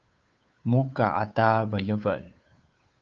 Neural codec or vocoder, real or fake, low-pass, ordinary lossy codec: codec, 16 kHz, 8 kbps, FunCodec, trained on Chinese and English, 25 frames a second; fake; 7.2 kHz; Opus, 24 kbps